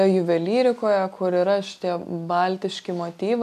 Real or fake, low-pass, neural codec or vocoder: real; 14.4 kHz; none